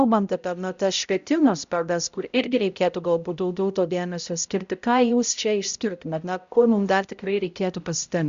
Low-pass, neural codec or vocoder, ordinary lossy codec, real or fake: 7.2 kHz; codec, 16 kHz, 0.5 kbps, X-Codec, HuBERT features, trained on balanced general audio; Opus, 64 kbps; fake